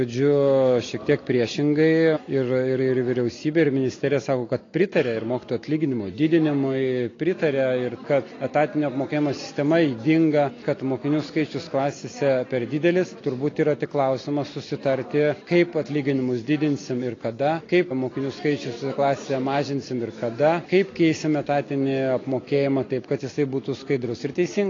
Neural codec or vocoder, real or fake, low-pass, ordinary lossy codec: none; real; 7.2 kHz; AAC, 32 kbps